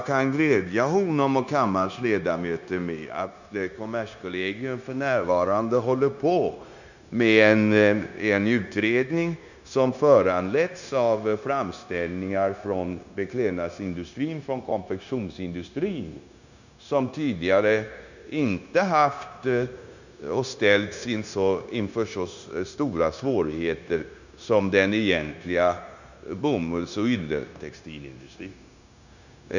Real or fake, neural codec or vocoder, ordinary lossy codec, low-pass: fake; codec, 16 kHz, 0.9 kbps, LongCat-Audio-Codec; none; 7.2 kHz